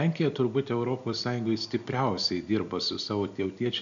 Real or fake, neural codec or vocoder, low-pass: real; none; 7.2 kHz